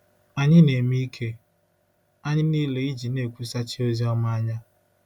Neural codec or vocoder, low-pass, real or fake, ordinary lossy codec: none; 19.8 kHz; real; none